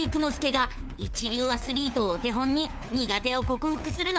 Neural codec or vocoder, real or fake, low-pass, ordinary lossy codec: codec, 16 kHz, 4 kbps, FunCodec, trained on LibriTTS, 50 frames a second; fake; none; none